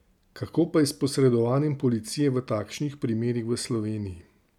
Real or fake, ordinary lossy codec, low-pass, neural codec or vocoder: real; none; 19.8 kHz; none